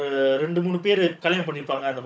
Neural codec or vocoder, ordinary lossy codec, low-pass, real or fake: codec, 16 kHz, 16 kbps, FunCodec, trained on Chinese and English, 50 frames a second; none; none; fake